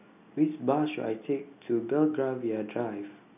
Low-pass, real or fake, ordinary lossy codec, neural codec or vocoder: 3.6 kHz; real; none; none